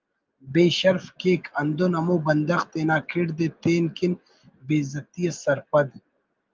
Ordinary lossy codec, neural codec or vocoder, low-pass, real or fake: Opus, 32 kbps; none; 7.2 kHz; real